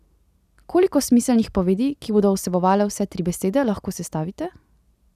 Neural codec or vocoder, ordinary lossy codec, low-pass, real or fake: none; none; 14.4 kHz; real